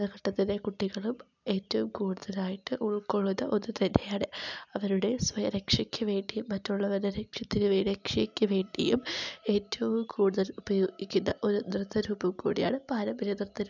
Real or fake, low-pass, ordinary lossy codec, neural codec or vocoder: real; 7.2 kHz; none; none